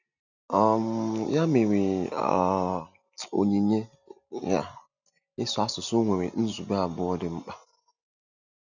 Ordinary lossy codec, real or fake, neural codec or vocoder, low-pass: none; real; none; 7.2 kHz